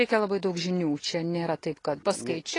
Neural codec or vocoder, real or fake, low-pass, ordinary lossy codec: none; real; 10.8 kHz; AAC, 32 kbps